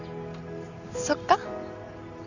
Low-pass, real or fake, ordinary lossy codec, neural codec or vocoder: 7.2 kHz; real; none; none